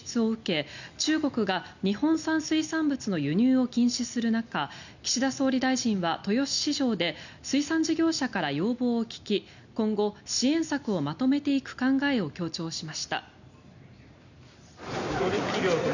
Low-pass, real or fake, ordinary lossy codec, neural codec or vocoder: 7.2 kHz; real; none; none